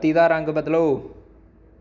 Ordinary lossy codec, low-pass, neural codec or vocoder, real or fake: none; 7.2 kHz; none; real